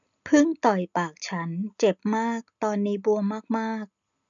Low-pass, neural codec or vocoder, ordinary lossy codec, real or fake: 7.2 kHz; none; none; real